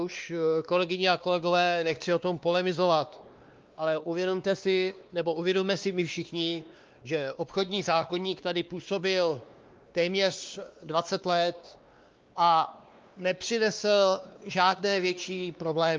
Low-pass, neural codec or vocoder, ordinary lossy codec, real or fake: 7.2 kHz; codec, 16 kHz, 2 kbps, X-Codec, WavLM features, trained on Multilingual LibriSpeech; Opus, 24 kbps; fake